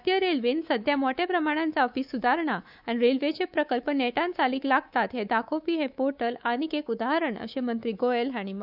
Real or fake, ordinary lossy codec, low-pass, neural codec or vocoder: fake; none; 5.4 kHz; codec, 24 kHz, 3.1 kbps, DualCodec